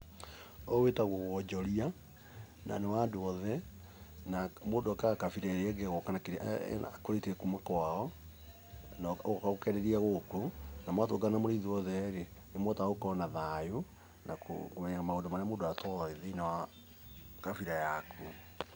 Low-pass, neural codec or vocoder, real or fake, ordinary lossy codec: none; none; real; none